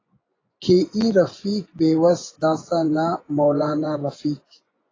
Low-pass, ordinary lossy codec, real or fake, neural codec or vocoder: 7.2 kHz; AAC, 32 kbps; fake; vocoder, 24 kHz, 100 mel bands, Vocos